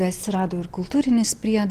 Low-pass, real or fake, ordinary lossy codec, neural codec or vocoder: 14.4 kHz; real; Opus, 16 kbps; none